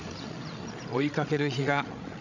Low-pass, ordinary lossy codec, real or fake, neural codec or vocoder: 7.2 kHz; none; fake; codec, 16 kHz, 16 kbps, FreqCodec, larger model